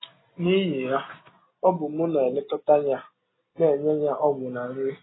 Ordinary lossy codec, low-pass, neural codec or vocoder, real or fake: AAC, 16 kbps; 7.2 kHz; none; real